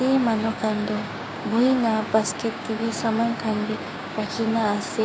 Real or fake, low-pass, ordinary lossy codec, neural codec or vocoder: fake; none; none; codec, 16 kHz, 6 kbps, DAC